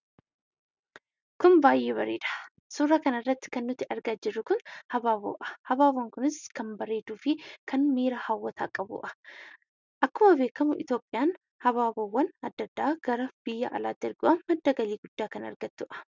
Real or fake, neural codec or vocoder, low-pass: real; none; 7.2 kHz